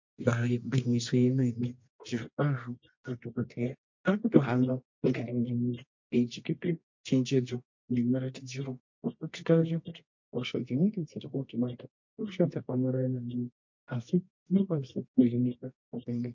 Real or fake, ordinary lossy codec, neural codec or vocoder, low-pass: fake; MP3, 48 kbps; codec, 24 kHz, 0.9 kbps, WavTokenizer, medium music audio release; 7.2 kHz